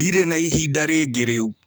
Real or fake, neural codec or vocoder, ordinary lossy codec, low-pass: fake; codec, 44.1 kHz, 2.6 kbps, SNAC; none; none